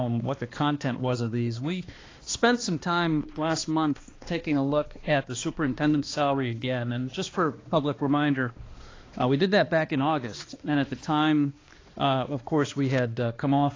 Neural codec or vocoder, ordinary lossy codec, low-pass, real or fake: codec, 16 kHz, 2 kbps, X-Codec, HuBERT features, trained on balanced general audio; AAC, 32 kbps; 7.2 kHz; fake